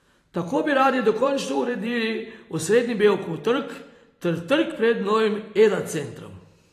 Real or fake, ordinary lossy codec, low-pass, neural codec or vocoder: fake; AAC, 48 kbps; 14.4 kHz; vocoder, 44.1 kHz, 128 mel bands every 512 samples, BigVGAN v2